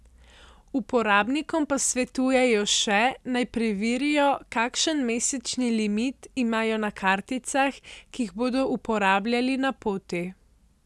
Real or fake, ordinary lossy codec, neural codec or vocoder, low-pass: real; none; none; none